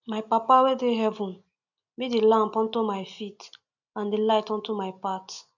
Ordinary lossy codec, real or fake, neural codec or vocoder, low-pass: none; real; none; 7.2 kHz